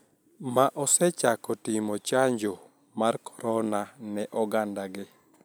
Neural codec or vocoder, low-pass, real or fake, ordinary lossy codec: none; none; real; none